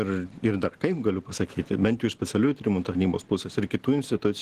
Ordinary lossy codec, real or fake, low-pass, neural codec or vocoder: Opus, 16 kbps; real; 14.4 kHz; none